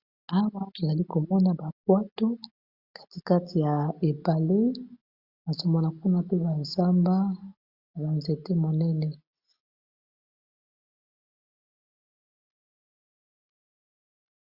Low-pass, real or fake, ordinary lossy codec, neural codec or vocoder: 5.4 kHz; real; Opus, 64 kbps; none